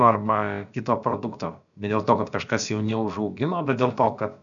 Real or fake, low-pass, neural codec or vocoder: fake; 7.2 kHz; codec, 16 kHz, about 1 kbps, DyCAST, with the encoder's durations